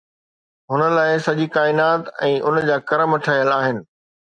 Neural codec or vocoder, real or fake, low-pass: none; real; 9.9 kHz